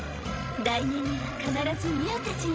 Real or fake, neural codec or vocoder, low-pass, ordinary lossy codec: fake; codec, 16 kHz, 16 kbps, FreqCodec, larger model; none; none